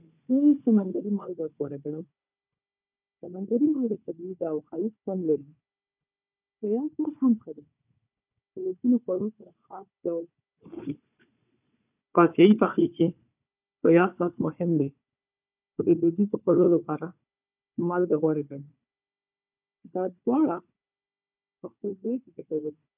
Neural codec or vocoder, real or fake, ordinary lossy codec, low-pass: codec, 16 kHz, 4 kbps, FunCodec, trained on Chinese and English, 50 frames a second; fake; none; 3.6 kHz